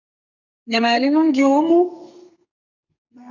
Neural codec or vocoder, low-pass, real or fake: codec, 32 kHz, 1.9 kbps, SNAC; 7.2 kHz; fake